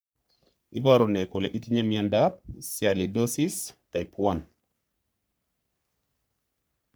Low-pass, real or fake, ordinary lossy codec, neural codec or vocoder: none; fake; none; codec, 44.1 kHz, 3.4 kbps, Pupu-Codec